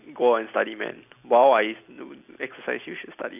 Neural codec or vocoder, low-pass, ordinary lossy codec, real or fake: none; 3.6 kHz; none; real